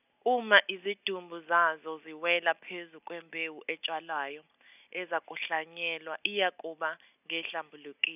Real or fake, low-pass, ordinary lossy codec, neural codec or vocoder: real; 3.6 kHz; none; none